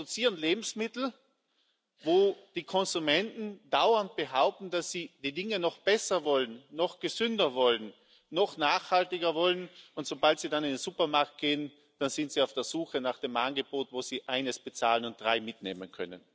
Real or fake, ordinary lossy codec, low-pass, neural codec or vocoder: real; none; none; none